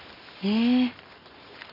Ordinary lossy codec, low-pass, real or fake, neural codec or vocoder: none; 5.4 kHz; real; none